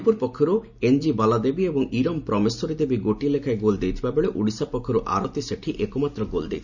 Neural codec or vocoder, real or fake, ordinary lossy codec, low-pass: none; real; none; 7.2 kHz